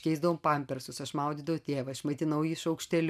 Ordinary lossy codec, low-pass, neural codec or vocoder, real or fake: MP3, 96 kbps; 14.4 kHz; none; real